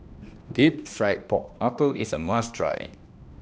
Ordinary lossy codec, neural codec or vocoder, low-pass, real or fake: none; codec, 16 kHz, 1 kbps, X-Codec, HuBERT features, trained on balanced general audio; none; fake